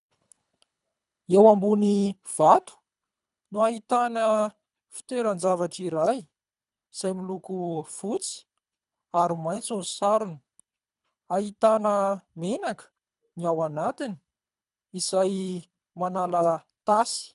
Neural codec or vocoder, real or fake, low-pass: codec, 24 kHz, 3 kbps, HILCodec; fake; 10.8 kHz